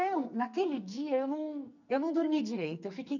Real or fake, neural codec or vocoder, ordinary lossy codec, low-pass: fake; codec, 44.1 kHz, 2.6 kbps, SNAC; none; 7.2 kHz